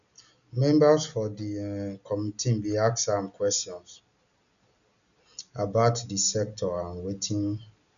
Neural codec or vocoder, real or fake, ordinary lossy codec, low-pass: none; real; none; 7.2 kHz